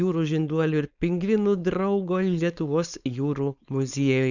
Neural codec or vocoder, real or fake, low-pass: codec, 16 kHz, 4.8 kbps, FACodec; fake; 7.2 kHz